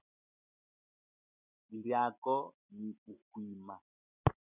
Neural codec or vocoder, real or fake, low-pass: none; real; 3.6 kHz